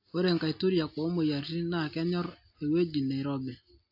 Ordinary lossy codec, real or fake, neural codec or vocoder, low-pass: AAC, 48 kbps; real; none; 5.4 kHz